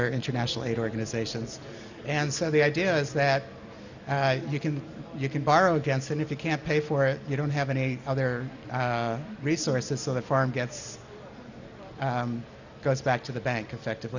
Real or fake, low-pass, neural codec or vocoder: real; 7.2 kHz; none